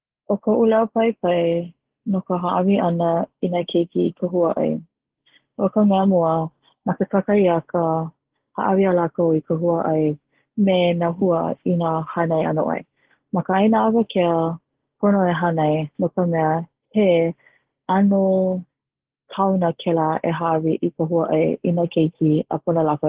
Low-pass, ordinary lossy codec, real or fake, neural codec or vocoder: 3.6 kHz; Opus, 16 kbps; real; none